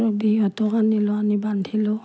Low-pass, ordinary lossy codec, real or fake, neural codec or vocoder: none; none; real; none